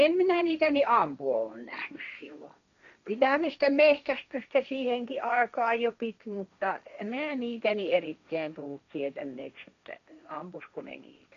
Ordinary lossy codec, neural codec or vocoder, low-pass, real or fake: none; codec, 16 kHz, 1.1 kbps, Voila-Tokenizer; 7.2 kHz; fake